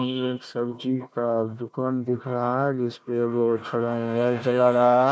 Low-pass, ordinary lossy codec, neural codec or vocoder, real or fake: none; none; codec, 16 kHz, 1 kbps, FunCodec, trained on Chinese and English, 50 frames a second; fake